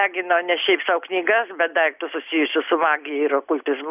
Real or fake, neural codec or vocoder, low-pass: real; none; 3.6 kHz